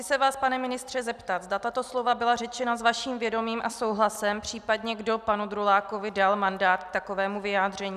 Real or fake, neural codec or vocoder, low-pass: real; none; 14.4 kHz